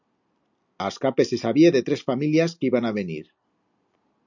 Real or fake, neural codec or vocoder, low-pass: real; none; 7.2 kHz